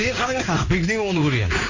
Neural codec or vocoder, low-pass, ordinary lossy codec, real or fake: codec, 16 kHz in and 24 kHz out, 1 kbps, XY-Tokenizer; 7.2 kHz; AAC, 48 kbps; fake